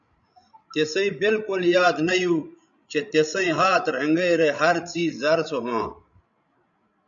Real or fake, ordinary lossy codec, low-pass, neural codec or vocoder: fake; MP3, 96 kbps; 7.2 kHz; codec, 16 kHz, 16 kbps, FreqCodec, larger model